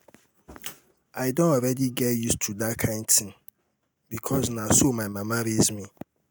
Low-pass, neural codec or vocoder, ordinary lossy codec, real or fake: none; none; none; real